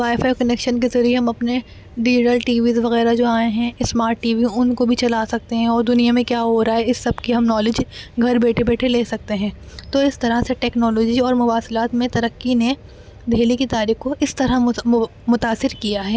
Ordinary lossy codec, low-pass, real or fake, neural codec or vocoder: none; none; real; none